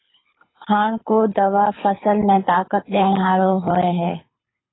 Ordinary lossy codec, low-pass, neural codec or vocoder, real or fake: AAC, 16 kbps; 7.2 kHz; codec, 24 kHz, 6 kbps, HILCodec; fake